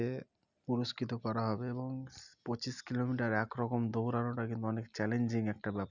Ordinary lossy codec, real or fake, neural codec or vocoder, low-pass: none; real; none; 7.2 kHz